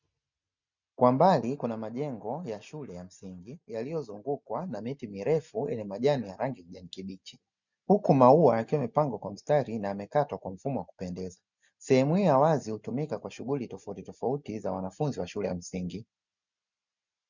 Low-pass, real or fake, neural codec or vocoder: 7.2 kHz; real; none